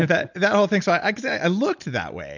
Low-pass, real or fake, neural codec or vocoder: 7.2 kHz; real; none